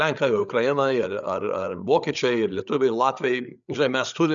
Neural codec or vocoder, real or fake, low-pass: codec, 16 kHz, 8 kbps, FreqCodec, larger model; fake; 7.2 kHz